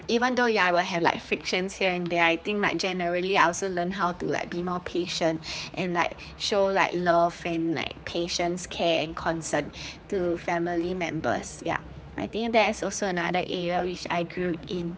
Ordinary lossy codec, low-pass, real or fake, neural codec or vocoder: none; none; fake; codec, 16 kHz, 4 kbps, X-Codec, HuBERT features, trained on general audio